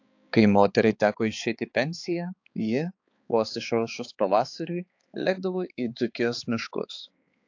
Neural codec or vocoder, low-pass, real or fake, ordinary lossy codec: codec, 16 kHz, 4 kbps, X-Codec, HuBERT features, trained on balanced general audio; 7.2 kHz; fake; AAC, 48 kbps